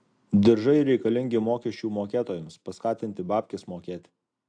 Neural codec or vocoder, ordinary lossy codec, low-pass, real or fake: none; AAC, 64 kbps; 9.9 kHz; real